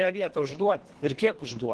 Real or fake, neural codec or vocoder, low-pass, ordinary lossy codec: fake; codec, 24 kHz, 1.5 kbps, HILCodec; 10.8 kHz; Opus, 16 kbps